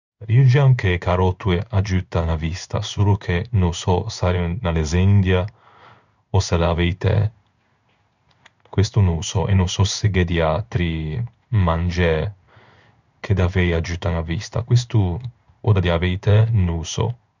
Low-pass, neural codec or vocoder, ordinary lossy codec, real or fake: 7.2 kHz; codec, 16 kHz in and 24 kHz out, 1 kbps, XY-Tokenizer; none; fake